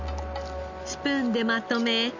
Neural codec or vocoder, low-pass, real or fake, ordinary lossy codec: none; 7.2 kHz; real; none